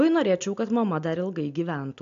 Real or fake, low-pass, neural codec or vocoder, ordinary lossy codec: real; 7.2 kHz; none; AAC, 64 kbps